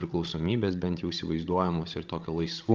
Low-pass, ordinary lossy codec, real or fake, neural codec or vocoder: 7.2 kHz; Opus, 32 kbps; fake; codec, 16 kHz, 16 kbps, FreqCodec, larger model